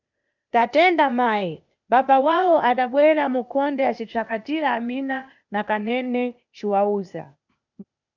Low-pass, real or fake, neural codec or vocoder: 7.2 kHz; fake; codec, 16 kHz, 0.8 kbps, ZipCodec